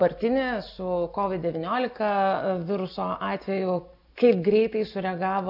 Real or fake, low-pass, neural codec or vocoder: real; 5.4 kHz; none